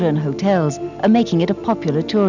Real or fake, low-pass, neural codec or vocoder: real; 7.2 kHz; none